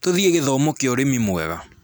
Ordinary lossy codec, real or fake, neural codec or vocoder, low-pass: none; real; none; none